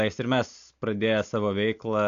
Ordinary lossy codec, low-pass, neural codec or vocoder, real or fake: MP3, 64 kbps; 7.2 kHz; none; real